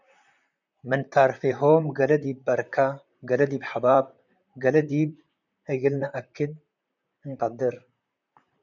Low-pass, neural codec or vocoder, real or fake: 7.2 kHz; vocoder, 44.1 kHz, 128 mel bands, Pupu-Vocoder; fake